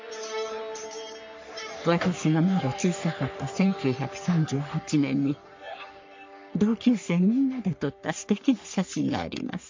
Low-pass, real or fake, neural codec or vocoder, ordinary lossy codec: 7.2 kHz; fake; codec, 44.1 kHz, 3.4 kbps, Pupu-Codec; MP3, 48 kbps